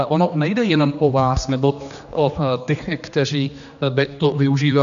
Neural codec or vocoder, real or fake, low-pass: codec, 16 kHz, 2 kbps, X-Codec, HuBERT features, trained on general audio; fake; 7.2 kHz